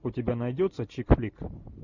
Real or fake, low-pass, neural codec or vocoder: real; 7.2 kHz; none